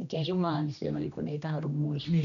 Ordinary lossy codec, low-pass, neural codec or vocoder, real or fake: none; 7.2 kHz; codec, 16 kHz, 1 kbps, X-Codec, HuBERT features, trained on general audio; fake